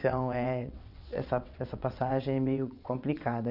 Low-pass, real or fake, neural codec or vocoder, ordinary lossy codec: 5.4 kHz; fake; vocoder, 22.05 kHz, 80 mel bands, WaveNeXt; none